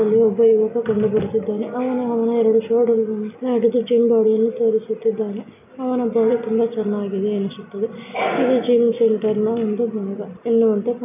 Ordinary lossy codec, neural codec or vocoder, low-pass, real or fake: none; none; 3.6 kHz; real